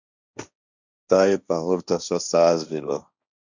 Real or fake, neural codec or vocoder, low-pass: fake; codec, 16 kHz, 1.1 kbps, Voila-Tokenizer; 7.2 kHz